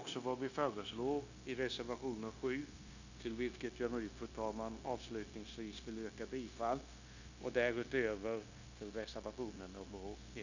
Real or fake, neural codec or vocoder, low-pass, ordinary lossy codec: fake; codec, 16 kHz, 0.9 kbps, LongCat-Audio-Codec; 7.2 kHz; none